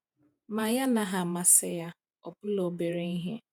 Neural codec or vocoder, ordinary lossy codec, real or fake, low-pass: vocoder, 48 kHz, 128 mel bands, Vocos; none; fake; none